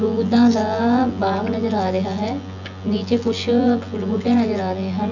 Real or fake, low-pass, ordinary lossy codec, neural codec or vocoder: fake; 7.2 kHz; none; vocoder, 24 kHz, 100 mel bands, Vocos